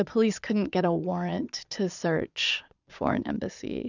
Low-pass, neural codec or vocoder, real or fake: 7.2 kHz; vocoder, 22.05 kHz, 80 mel bands, Vocos; fake